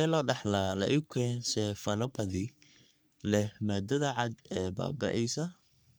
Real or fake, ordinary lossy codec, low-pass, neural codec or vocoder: fake; none; none; codec, 44.1 kHz, 3.4 kbps, Pupu-Codec